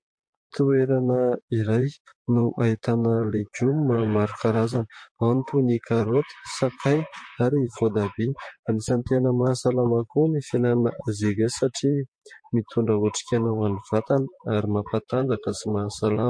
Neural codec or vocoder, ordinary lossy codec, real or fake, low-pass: vocoder, 44.1 kHz, 128 mel bands, Pupu-Vocoder; MP3, 48 kbps; fake; 9.9 kHz